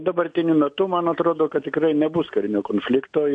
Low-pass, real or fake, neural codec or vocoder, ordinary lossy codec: 9.9 kHz; real; none; MP3, 64 kbps